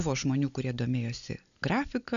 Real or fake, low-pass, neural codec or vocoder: real; 7.2 kHz; none